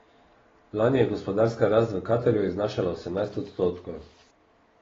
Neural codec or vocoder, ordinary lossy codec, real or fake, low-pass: none; AAC, 24 kbps; real; 7.2 kHz